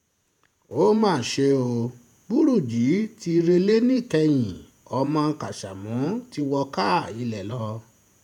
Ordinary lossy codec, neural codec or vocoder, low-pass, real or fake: none; vocoder, 48 kHz, 128 mel bands, Vocos; 19.8 kHz; fake